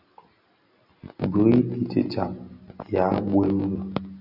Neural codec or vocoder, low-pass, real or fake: none; 5.4 kHz; real